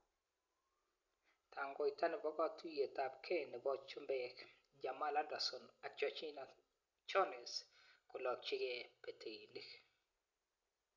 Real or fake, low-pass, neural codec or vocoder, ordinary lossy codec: real; 7.2 kHz; none; none